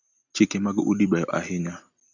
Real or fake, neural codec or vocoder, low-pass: real; none; 7.2 kHz